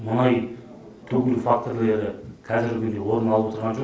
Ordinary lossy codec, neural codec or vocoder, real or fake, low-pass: none; none; real; none